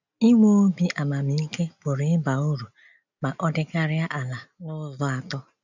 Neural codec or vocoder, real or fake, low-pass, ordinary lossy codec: none; real; 7.2 kHz; none